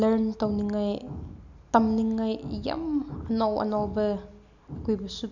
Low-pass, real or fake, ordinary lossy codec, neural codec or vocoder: 7.2 kHz; real; none; none